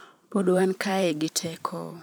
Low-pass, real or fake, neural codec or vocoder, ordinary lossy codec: none; fake; vocoder, 44.1 kHz, 128 mel bands every 512 samples, BigVGAN v2; none